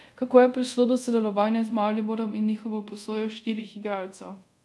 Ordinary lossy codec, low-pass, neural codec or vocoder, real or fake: none; none; codec, 24 kHz, 0.5 kbps, DualCodec; fake